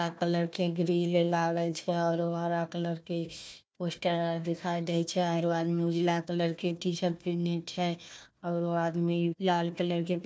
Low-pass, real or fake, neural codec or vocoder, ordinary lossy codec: none; fake; codec, 16 kHz, 1 kbps, FunCodec, trained on Chinese and English, 50 frames a second; none